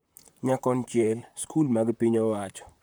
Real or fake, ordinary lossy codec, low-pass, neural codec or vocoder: fake; none; none; vocoder, 44.1 kHz, 128 mel bands, Pupu-Vocoder